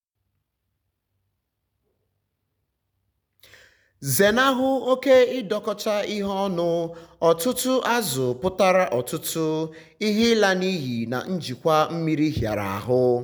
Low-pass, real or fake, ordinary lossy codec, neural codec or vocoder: none; real; none; none